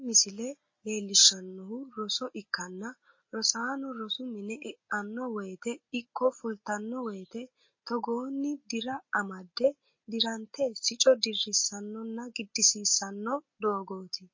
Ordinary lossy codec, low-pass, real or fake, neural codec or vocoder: MP3, 32 kbps; 7.2 kHz; real; none